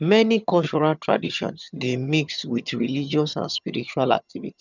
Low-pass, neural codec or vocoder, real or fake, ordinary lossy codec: 7.2 kHz; vocoder, 22.05 kHz, 80 mel bands, HiFi-GAN; fake; none